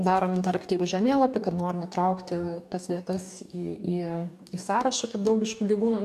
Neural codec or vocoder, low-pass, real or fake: codec, 44.1 kHz, 2.6 kbps, DAC; 14.4 kHz; fake